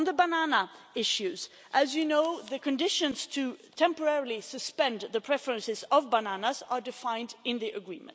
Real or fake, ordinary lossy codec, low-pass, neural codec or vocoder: real; none; none; none